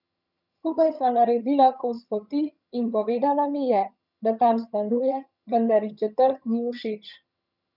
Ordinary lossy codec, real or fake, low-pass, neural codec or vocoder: none; fake; 5.4 kHz; vocoder, 22.05 kHz, 80 mel bands, HiFi-GAN